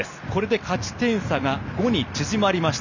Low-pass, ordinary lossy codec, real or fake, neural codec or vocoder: 7.2 kHz; none; real; none